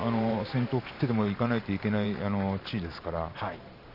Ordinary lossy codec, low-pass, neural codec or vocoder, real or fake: none; 5.4 kHz; vocoder, 44.1 kHz, 128 mel bands every 512 samples, BigVGAN v2; fake